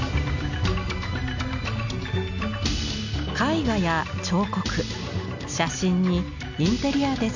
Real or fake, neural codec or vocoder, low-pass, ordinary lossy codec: real; none; 7.2 kHz; none